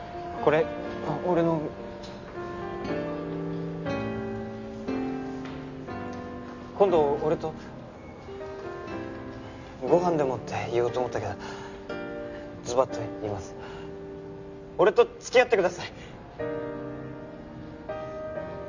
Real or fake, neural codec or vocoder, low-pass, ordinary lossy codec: real; none; 7.2 kHz; none